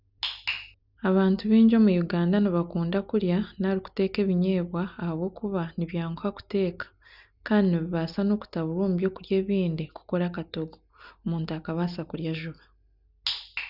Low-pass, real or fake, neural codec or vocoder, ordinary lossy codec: 5.4 kHz; real; none; none